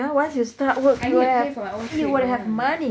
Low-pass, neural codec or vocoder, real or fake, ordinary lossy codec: none; none; real; none